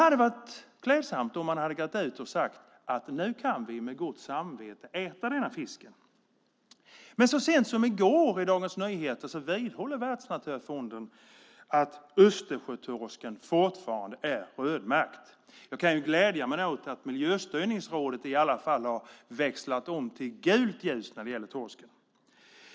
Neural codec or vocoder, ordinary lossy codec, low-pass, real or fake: none; none; none; real